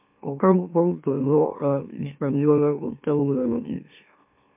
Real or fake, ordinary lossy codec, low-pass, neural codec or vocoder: fake; MP3, 32 kbps; 3.6 kHz; autoencoder, 44.1 kHz, a latent of 192 numbers a frame, MeloTTS